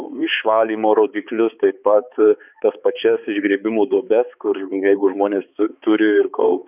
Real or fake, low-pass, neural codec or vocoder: fake; 3.6 kHz; codec, 16 kHz, 4 kbps, X-Codec, HuBERT features, trained on balanced general audio